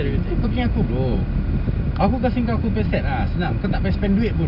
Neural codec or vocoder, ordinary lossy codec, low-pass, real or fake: none; none; 5.4 kHz; real